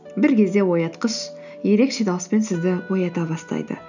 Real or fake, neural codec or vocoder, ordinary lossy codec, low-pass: real; none; none; 7.2 kHz